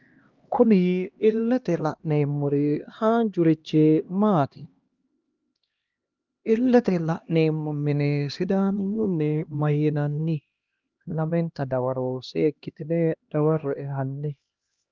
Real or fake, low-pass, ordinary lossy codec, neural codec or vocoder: fake; 7.2 kHz; Opus, 24 kbps; codec, 16 kHz, 1 kbps, X-Codec, HuBERT features, trained on LibriSpeech